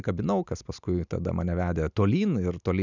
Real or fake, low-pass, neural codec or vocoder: real; 7.2 kHz; none